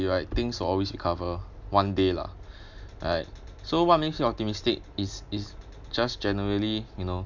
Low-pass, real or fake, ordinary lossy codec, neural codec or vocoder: 7.2 kHz; real; none; none